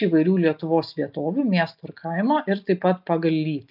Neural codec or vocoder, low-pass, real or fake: none; 5.4 kHz; real